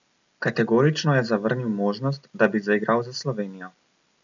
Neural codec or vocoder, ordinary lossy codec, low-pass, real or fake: none; none; 7.2 kHz; real